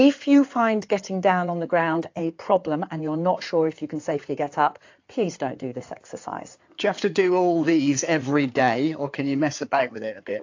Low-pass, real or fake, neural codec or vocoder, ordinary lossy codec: 7.2 kHz; fake; codec, 16 kHz in and 24 kHz out, 2.2 kbps, FireRedTTS-2 codec; AAC, 48 kbps